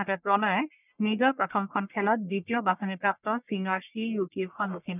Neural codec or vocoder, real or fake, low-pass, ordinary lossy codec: codec, 44.1 kHz, 1.7 kbps, Pupu-Codec; fake; 3.6 kHz; none